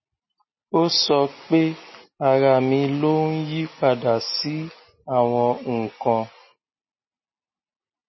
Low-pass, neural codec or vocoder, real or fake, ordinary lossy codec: 7.2 kHz; none; real; MP3, 24 kbps